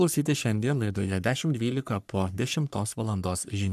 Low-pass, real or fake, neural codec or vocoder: 14.4 kHz; fake; codec, 44.1 kHz, 3.4 kbps, Pupu-Codec